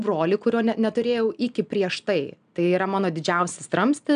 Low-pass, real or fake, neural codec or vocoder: 9.9 kHz; real; none